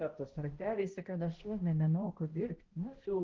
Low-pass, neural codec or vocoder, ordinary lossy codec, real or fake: 7.2 kHz; codec, 16 kHz, 0.5 kbps, X-Codec, HuBERT features, trained on balanced general audio; Opus, 16 kbps; fake